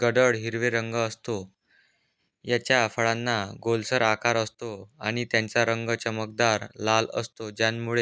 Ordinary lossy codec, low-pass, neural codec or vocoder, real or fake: none; none; none; real